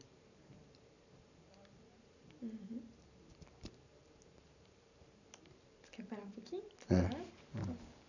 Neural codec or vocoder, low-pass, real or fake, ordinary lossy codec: vocoder, 44.1 kHz, 128 mel bands every 512 samples, BigVGAN v2; 7.2 kHz; fake; none